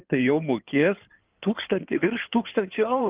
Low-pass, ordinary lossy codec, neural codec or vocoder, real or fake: 3.6 kHz; Opus, 32 kbps; codec, 16 kHz in and 24 kHz out, 2.2 kbps, FireRedTTS-2 codec; fake